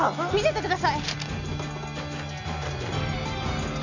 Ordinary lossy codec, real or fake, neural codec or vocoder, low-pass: none; real; none; 7.2 kHz